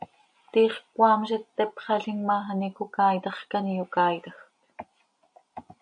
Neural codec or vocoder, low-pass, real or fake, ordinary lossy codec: none; 9.9 kHz; real; AAC, 64 kbps